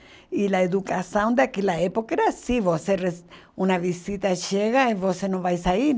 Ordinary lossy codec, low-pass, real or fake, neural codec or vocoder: none; none; real; none